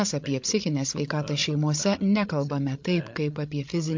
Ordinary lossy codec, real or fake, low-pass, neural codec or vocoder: MP3, 48 kbps; fake; 7.2 kHz; codec, 16 kHz, 16 kbps, FreqCodec, larger model